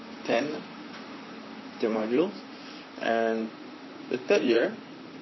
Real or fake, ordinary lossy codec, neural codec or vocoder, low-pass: fake; MP3, 24 kbps; vocoder, 44.1 kHz, 128 mel bands, Pupu-Vocoder; 7.2 kHz